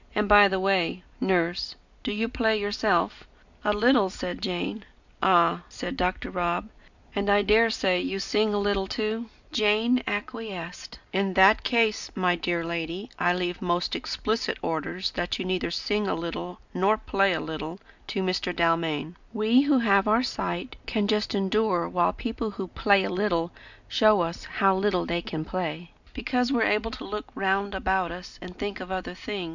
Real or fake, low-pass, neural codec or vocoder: real; 7.2 kHz; none